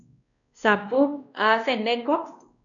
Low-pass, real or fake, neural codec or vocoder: 7.2 kHz; fake; codec, 16 kHz, 1 kbps, X-Codec, WavLM features, trained on Multilingual LibriSpeech